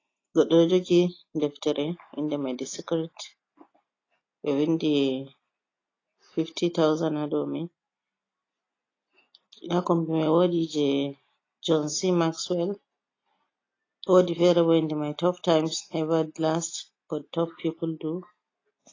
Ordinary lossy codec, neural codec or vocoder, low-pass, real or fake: AAC, 32 kbps; none; 7.2 kHz; real